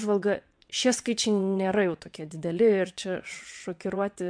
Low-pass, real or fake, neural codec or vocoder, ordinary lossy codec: 9.9 kHz; fake; vocoder, 22.05 kHz, 80 mel bands, WaveNeXt; MP3, 64 kbps